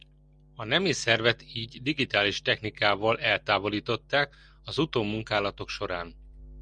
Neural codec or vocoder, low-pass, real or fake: none; 9.9 kHz; real